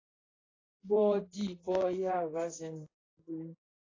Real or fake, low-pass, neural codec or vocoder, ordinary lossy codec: fake; 7.2 kHz; vocoder, 44.1 kHz, 128 mel bands, Pupu-Vocoder; AAC, 32 kbps